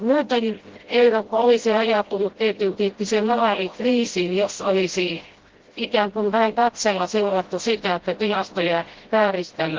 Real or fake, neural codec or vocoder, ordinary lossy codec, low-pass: fake; codec, 16 kHz, 0.5 kbps, FreqCodec, smaller model; Opus, 16 kbps; 7.2 kHz